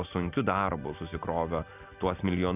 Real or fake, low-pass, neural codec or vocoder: real; 3.6 kHz; none